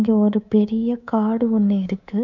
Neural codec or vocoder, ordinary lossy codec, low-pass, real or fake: codec, 24 kHz, 3.1 kbps, DualCodec; none; 7.2 kHz; fake